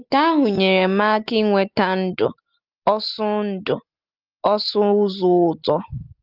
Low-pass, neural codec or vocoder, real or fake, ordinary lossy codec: 5.4 kHz; none; real; Opus, 32 kbps